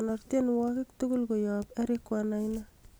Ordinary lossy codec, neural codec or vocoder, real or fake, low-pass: none; none; real; none